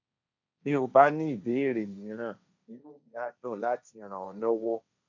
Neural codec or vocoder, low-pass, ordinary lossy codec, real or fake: codec, 16 kHz, 1.1 kbps, Voila-Tokenizer; 7.2 kHz; none; fake